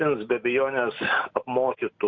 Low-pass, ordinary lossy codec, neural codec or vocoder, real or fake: 7.2 kHz; MP3, 64 kbps; none; real